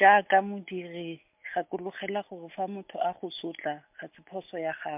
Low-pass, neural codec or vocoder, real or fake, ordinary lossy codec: 3.6 kHz; none; real; MP3, 32 kbps